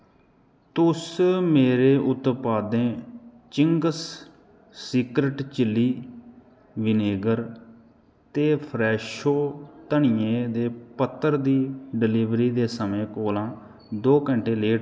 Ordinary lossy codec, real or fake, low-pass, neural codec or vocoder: none; real; none; none